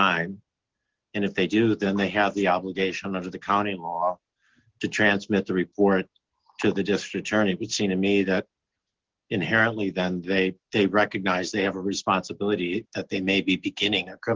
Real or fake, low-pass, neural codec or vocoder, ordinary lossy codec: real; 7.2 kHz; none; Opus, 16 kbps